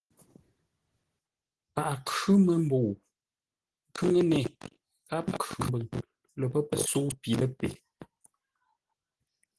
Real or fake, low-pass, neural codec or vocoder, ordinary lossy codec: real; 10.8 kHz; none; Opus, 16 kbps